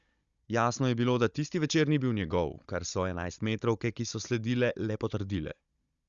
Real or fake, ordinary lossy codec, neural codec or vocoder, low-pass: fake; Opus, 64 kbps; codec, 16 kHz, 16 kbps, FunCodec, trained on Chinese and English, 50 frames a second; 7.2 kHz